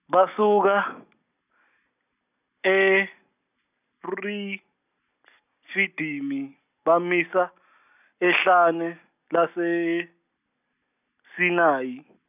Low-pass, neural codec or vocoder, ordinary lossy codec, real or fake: 3.6 kHz; none; none; real